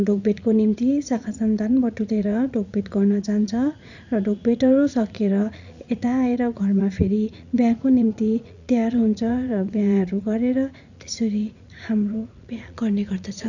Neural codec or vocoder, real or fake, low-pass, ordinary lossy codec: vocoder, 44.1 kHz, 80 mel bands, Vocos; fake; 7.2 kHz; none